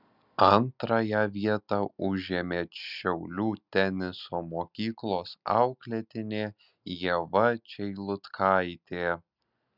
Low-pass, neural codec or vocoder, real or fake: 5.4 kHz; none; real